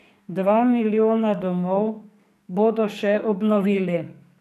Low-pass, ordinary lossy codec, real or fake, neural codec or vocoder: 14.4 kHz; none; fake; codec, 44.1 kHz, 2.6 kbps, SNAC